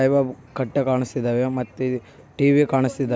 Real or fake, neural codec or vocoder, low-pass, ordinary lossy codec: real; none; none; none